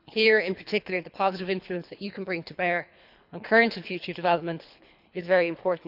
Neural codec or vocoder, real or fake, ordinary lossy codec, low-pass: codec, 24 kHz, 3 kbps, HILCodec; fake; none; 5.4 kHz